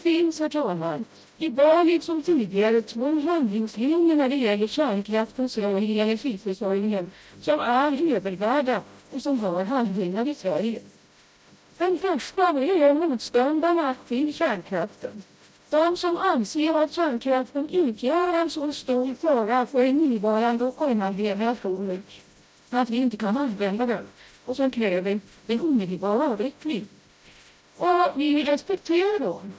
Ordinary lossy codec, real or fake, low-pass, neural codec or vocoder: none; fake; none; codec, 16 kHz, 0.5 kbps, FreqCodec, smaller model